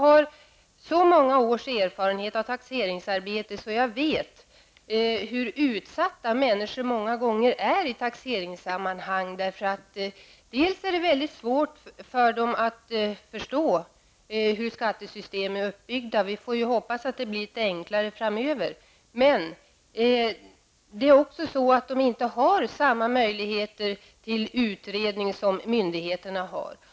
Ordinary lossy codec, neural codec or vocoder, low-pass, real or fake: none; none; none; real